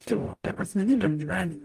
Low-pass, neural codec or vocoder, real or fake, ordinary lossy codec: 14.4 kHz; codec, 44.1 kHz, 0.9 kbps, DAC; fake; Opus, 32 kbps